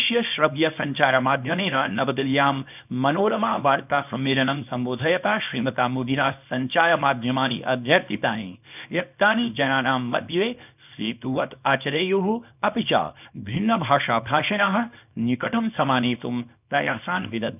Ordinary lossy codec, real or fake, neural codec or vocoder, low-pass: none; fake; codec, 24 kHz, 0.9 kbps, WavTokenizer, small release; 3.6 kHz